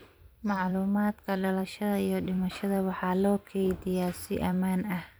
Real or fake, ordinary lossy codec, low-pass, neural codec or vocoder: fake; none; none; vocoder, 44.1 kHz, 128 mel bands every 256 samples, BigVGAN v2